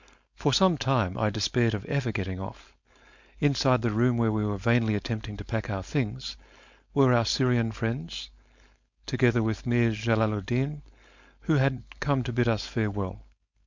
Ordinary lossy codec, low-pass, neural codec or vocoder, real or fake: MP3, 64 kbps; 7.2 kHz; codec, 16 kHz, 4.8 kbps, FACodec; fake